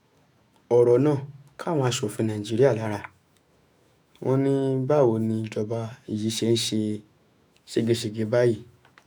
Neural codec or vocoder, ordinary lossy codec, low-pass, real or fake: autoencoder, 48 kHz, 128 numbers a frame, DAC-VAE, trained on Japanese speech; none; none; fake